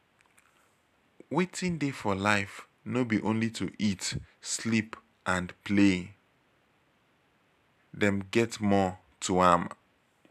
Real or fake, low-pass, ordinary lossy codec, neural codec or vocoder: real; 14.4 kHz; none; none